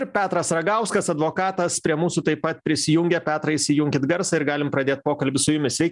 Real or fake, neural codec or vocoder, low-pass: real; none; 10.8 kHz